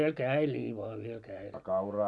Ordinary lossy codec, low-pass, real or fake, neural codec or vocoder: none; none; real; none